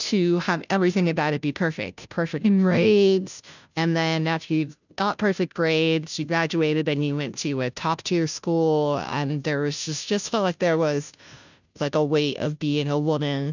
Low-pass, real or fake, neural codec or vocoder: 7.2 kHz; fake; codec, 16 kHz, 0.5 kbps, FunCodec, trained on Chinese and English, 25 frames a second